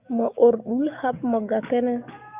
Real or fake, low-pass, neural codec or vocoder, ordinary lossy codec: fake; 3.6 kHz; codec, 44.1 kHz, 7.8 kbps, DAC; Opus, 64 kbps